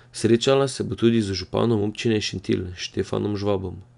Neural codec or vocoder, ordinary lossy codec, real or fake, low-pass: none; none; real; 10.8 kHz